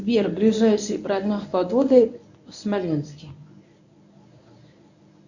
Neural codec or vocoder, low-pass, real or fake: codec, 24 kHz, 0.9 kbps, WavTokenizer, medium speech release version 1; 7.2 kHz; fake